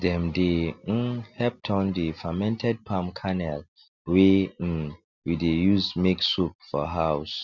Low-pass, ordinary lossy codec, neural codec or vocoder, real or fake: 7.2 kHz; none; none; real